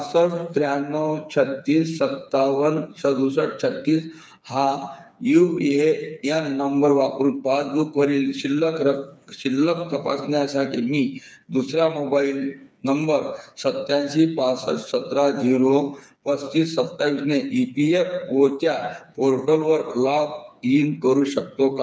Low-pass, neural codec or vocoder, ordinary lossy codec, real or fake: none; codec, 16 kHz, 4 kbps, FreqCodec, smaller model; none; fake